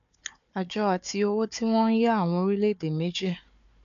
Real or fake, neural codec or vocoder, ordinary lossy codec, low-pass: fake; codec, 16 kHz, 4 kbps, FunCodec, trained on Chinese and English, 50 frames a second; none; 7.2 kHz